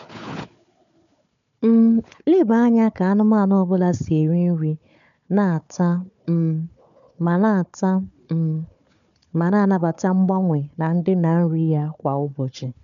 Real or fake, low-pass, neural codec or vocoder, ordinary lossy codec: fake; 7.2 kHz; codec, 16 kHz, 4 kbps, FunCodec, trained on Chinese and English, 50 frames a second; MP3, 96 kbps